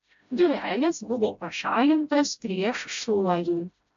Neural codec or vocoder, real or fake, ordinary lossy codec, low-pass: codec, 16 kHz, 0.5 kbps, FreqCodec, smaller model; fake; AAC, 64 kbps; 7.2 kHz